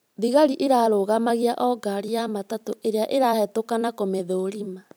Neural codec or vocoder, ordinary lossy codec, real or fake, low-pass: vocoder, 44.1 kHz, 128 mel bands every 256 samples, BigVGAN v2; none; fake; none